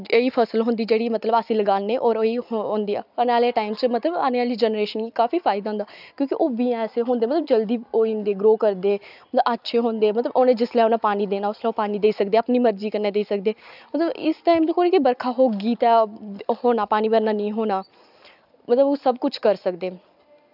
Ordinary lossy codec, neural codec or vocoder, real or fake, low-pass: none; none; real; 5.4 kHz